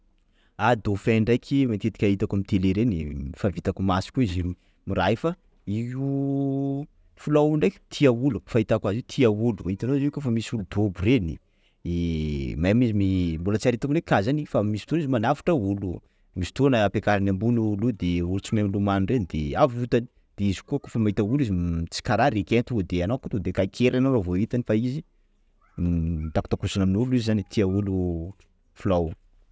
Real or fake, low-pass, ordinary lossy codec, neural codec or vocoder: real; none; none; none